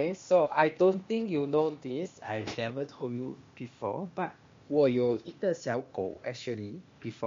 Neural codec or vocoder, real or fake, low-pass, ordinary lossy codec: codec, 16 kHz, 0.8 kbps, ZipCodec; fake; 7.2 kHz; MP3, 48 kbps